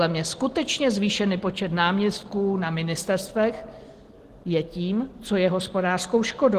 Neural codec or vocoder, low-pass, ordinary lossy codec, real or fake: none; 14.4 kHz; Opus, 16 kbps; real